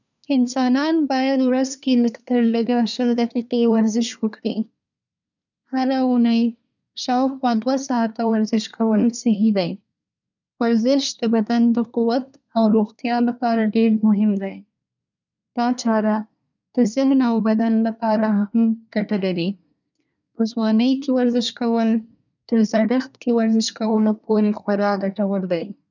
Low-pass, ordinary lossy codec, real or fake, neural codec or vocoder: 7.2 kHz; none; fake; codec, 24 kHz, 1 kbps, SNAC